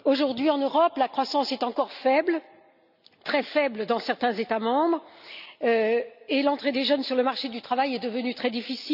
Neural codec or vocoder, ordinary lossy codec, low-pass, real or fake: none; none; 5.4 kHz; real